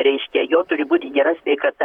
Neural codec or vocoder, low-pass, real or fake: vocoder, 44.1 kHz, 128 mel bands, Pupu-Vocoder; 19.8 kHz; fake